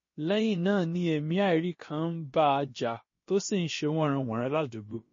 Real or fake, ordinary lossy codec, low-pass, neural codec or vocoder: fake; MP3, 32 kbps; 7.2 kHz; codec, 16 kHz, about 1 kbps, DyCAST, with the encoder's durations